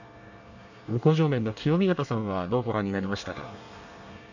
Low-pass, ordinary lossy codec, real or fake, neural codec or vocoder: 7.2 kHz; none; fake; codec, 24 kHz, 1 kbps, SNAC